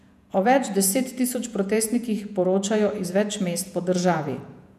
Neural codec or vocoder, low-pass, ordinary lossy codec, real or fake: vocoder, 48 kHz, 128 mel bands, Vocos; 14.4 kHz; none; fake